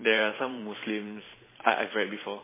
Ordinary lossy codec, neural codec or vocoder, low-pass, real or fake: MP3, 16 kbps; vocoder, 44.1 kHz, 128 mel bands every 512 samples, BigVGAN v2; 3.6 kHz; fake